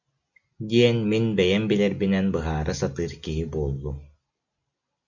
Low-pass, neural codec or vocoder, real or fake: 7.2 kHz; none; real